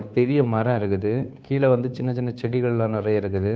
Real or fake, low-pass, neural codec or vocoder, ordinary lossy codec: fake; none; codec, 16 kHz, 2 kbps, FunCodec, trained on Chinese and English, 25 frames a second; none